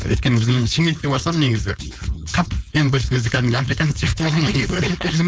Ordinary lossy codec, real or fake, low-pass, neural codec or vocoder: none; fake; none; codec, 16 kHz, 4.8 kbps, FACodec